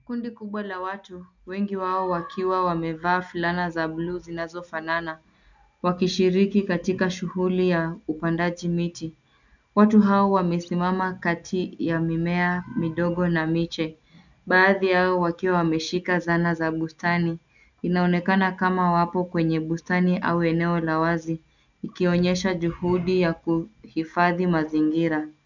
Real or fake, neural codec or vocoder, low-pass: real; none; 7.2 kHz